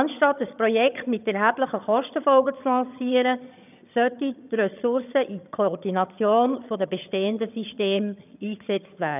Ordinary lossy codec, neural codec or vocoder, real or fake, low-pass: none; vocoder, 22.05 kHz, 80 mel bands, HiFi-GAN; fake; 3.6 kHz